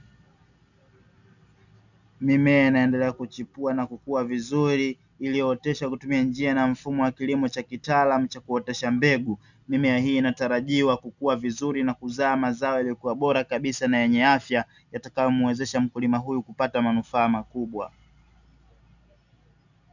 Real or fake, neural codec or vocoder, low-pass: real; none; 7.2 kHz